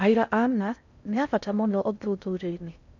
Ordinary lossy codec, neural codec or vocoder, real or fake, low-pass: none; codec, 16 kHz in and 24 kHz out, 0.6 kbps, FocalCodec, streaming, 2048 codes; fake; 7.2 kHz